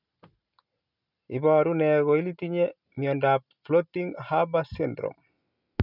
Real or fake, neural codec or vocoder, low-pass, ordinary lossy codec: real; none; 5.4 kHz; none